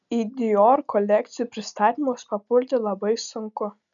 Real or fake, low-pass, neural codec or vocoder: real; 7.2 kHz; none